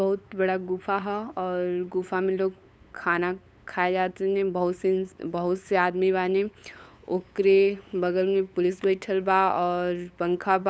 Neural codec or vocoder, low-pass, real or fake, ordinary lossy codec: codec, 16 kHz, 8 kbps, FunCodec, trained on LibriTTS, 25 frames a second; none; fake; none